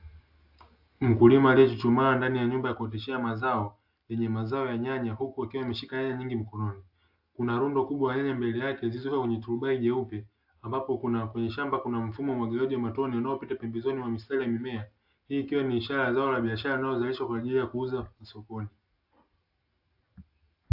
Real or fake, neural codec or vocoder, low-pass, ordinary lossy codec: real; none; 5.4 kHz; AAC, 48 kbps